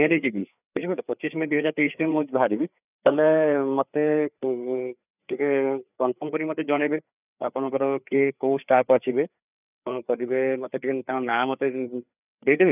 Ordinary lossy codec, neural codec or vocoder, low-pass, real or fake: none; codec, 16 kHz, 4 kbps, FreqCodec, larger model; 3.6 kHz; fake